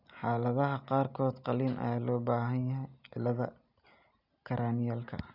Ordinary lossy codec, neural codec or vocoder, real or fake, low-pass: none; none; real; 5.4 kHz